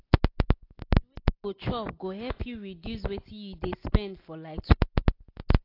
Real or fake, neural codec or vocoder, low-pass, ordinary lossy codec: real; none; 5.4 kHz; AAC, 32 kbps